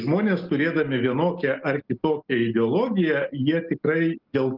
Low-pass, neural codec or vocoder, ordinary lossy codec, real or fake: 5.4 kHz; none; Opus, 32 kbps; real